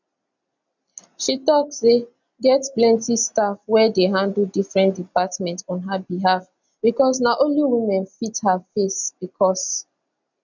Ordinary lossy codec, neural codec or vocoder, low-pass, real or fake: none; none; 7.2 kHz; real